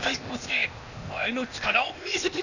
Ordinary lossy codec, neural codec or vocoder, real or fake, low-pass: none; codec, 16 kHz, 0.8 kbps, ZipCodec; fake; 7.2 kHz